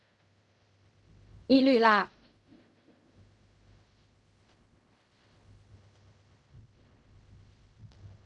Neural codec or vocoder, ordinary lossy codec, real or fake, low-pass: codec, 16 kHz in and 24 kHz out, 0.4 kbps, LongCat-Audio-Codec, fine tuned four codebook decoder; Opus, 64 kbps; fake; 10.8 kHz